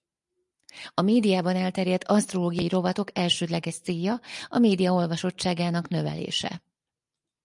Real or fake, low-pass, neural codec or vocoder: real; 10.8 kHz; none